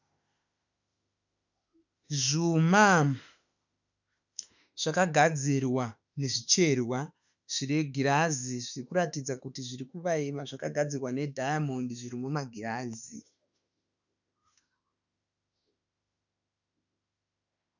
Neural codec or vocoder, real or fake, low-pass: autoencoder, 48 kHz, 32 numbers a frame, DAC-VAE, trained on Japanese speech; fake; 7.2 kHz